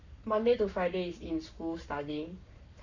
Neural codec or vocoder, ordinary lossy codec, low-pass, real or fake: codec, 44.1 kHz, 7.8 kbps, Pupu-Codec; none; 7.2 kHz; fake